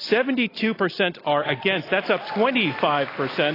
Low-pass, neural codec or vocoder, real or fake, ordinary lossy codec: 5.4 kHz; none; real; AAC, 24 kbps